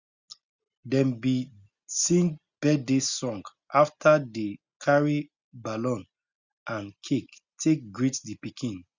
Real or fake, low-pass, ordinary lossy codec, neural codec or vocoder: real; 7.2 kHz; Opus, 64 kbps; none